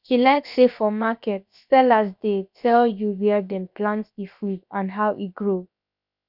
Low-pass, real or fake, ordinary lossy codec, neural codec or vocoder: 5.4 kHz; fake; none; codec, 16 kHz, about 1 kbps, DyCAST, with the encoder's durations